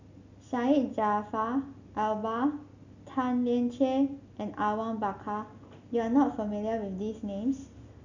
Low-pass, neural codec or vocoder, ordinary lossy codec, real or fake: 7.2 kHz; none; none; real